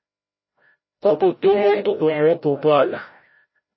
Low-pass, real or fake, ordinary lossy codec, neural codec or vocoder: 7.2 kHz; fake; MP3, 24 kbps; codec, 16 kHz, 0.5 kbps, FreqCodec, larger model